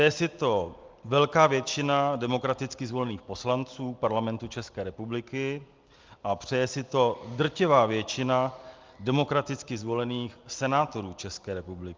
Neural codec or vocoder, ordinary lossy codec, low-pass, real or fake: none; Opus, 32 kbps; 7.2 kHz; real